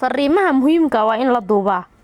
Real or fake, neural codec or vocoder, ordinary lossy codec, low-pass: real; none; Opus, 64 kbps; 19.8 kHz